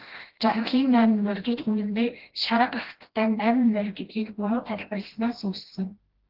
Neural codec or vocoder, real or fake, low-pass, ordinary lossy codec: codec, 16 kHz, 1 kbps, FreqCodec, smaller model; fake; 5.4 kHz; Opus, 16 kbps